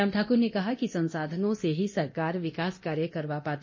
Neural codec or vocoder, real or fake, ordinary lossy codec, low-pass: codec, 24 kHz, 0.9 kbps, DualCodec; fake; MP3, 32 kbps; 7.2 kHz